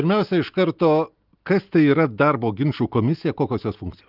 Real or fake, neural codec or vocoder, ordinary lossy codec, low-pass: real; none; Opus, 32 kbps; 5.4 kHz